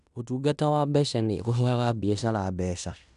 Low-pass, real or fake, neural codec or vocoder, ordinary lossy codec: 10.8 kHz; fake; codec, 16 kHz in and 24 kHz out, 0.9 kbps, LongCat-Audio-Codec, fine tuned four codebook decoder; none